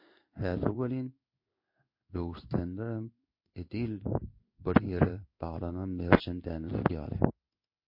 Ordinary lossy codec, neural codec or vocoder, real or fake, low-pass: MP3, 32 kbps; codec, 16 kHz in and 24 kHz out, 1 kbps, XY-Tokenizer; fake; 5.4 kHz